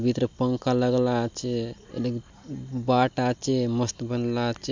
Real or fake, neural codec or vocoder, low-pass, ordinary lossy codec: real; none; 7.2 kHz; MP3, 64 kbps